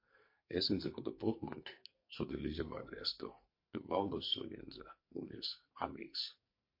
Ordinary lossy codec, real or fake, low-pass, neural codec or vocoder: MP3, 32 kbps; fake; 5.4 kHz; codec, 44.1 kHz, 2.6 kbps, SNAC